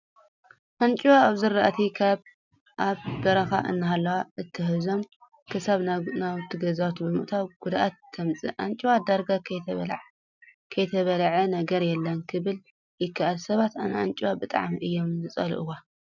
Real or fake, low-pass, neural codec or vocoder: real; 7.2 kHz; none